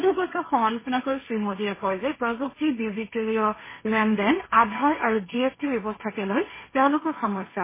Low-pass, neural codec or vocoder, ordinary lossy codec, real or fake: 3.6 kHz; codec, 16 kHz, 1.1 kbps, Voila-Tokenizer; MP3, 16 kbps; fake